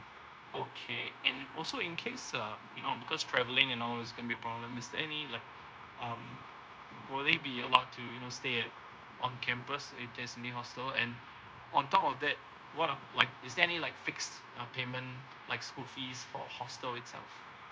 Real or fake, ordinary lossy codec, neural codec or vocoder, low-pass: fake; none; codec, 16 kHz, 0.9 kbps, LongCat-Audio-Codec; none